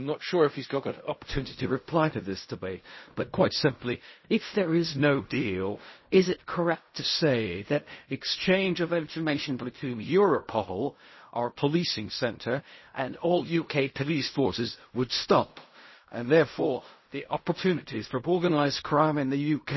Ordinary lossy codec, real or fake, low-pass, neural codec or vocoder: MP3, 24 kbps; fake; 7.2 kHz; codec, 16 kHz in and 24 kHz out, 0.4 kbps, LongCat-Audio-Codec, fine tuned four codebook decoder